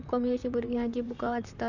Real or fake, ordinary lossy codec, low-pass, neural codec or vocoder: fake; none; 7.2 kHz; vocoder, 44.1 kHz, 128 mel bands, Pupu-Vocoder